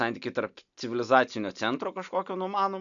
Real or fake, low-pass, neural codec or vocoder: real; 7.2 kHz; none